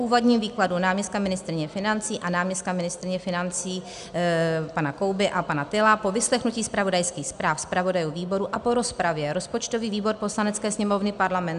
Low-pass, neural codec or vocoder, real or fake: 10.8 kHz; none; real